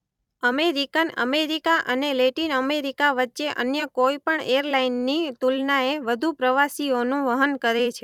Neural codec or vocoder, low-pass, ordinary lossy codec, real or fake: vocoder, 44.1 kHz, 128 mel bands every 256 samples, BigVGAN v2; 19.8 kHz; none; fake